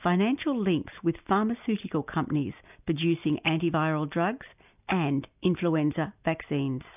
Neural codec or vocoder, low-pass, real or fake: none; 3.6 kHz; real